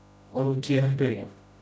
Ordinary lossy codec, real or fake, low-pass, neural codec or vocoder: none; fake; none; codec, 16 kHz, 0.5 kbps, FreqCodec, smaller model